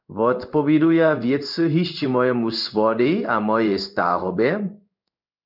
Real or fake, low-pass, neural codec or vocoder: fake; 5.4 kHz; codec, 16 kHz in and 24 kHz out, 1 kbps, XY-Tokenizer